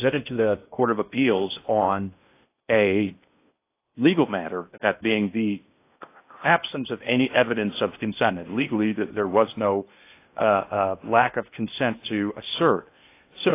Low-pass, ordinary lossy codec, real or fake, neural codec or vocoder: 3.6 kHz; AAC, 24 kbps; fake; codec, 16 kHz in and 24 kHz out, 0.6 kbps, FocalCodec, streaming, 4096 codes